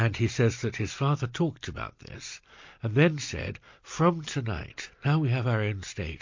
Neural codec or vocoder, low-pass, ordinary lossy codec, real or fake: none; 7.2 kHz; MP3, 48 kbps; real